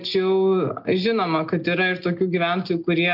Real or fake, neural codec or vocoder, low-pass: real; none; 5.4 kHz